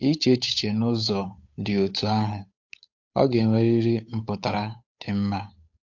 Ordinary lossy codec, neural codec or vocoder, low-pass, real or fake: AAC, 48 kbps; codec, 16 kHz, 8 kbps, FunCodec, trained on Chinese and English, 25 frames a second; 7.2 kHz; fake